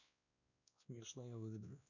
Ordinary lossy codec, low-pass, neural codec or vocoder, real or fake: none; 7.2 kHz; codec, 16 kHz, 1 kbps, X-Codec, WavLM features, trained on Multilingual LibriSpeech; fake